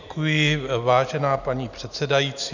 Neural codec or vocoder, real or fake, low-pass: none; real; 7.2 kHz